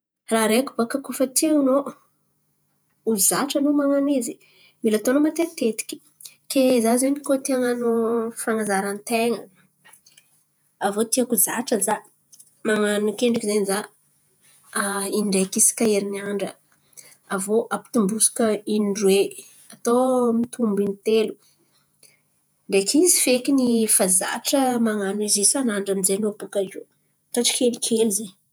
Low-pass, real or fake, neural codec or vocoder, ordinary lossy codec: none; fake; vocoder, 48 kHz, 128 mel bands, Vocos; none